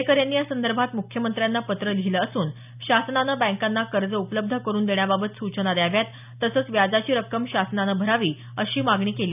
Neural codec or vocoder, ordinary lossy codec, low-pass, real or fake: none; none; 3.6 kHz; real